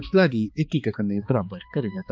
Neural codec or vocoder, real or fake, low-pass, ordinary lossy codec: codec, 16 kHz, 2 kbps, X-Codec, HuBERT features, trained on balanced general audio; fake; none; none